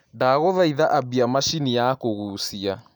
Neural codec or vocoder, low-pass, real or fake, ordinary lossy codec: none; none; real; none